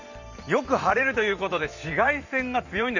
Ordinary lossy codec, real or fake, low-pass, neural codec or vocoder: none; fake; 7.2 kHz; vocoder, 44.1 kHz, 128 mel bands every 512 samples, BigVGAN v2